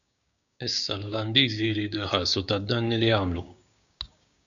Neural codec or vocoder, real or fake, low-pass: codec, 16 kHz, 6 kbps, DAC; fake; 7.2 kHz